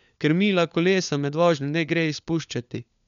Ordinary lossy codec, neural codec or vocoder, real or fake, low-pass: none; codec, 16 kHz, 4 kbps, FunCodec, trained on LibriTTS, 50 frames a second; fake; 7.2 kHz